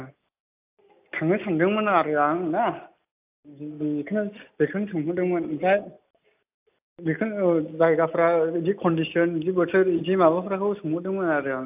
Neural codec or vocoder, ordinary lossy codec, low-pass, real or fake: none; AAC, 32 kbps; 3.6 kHz; real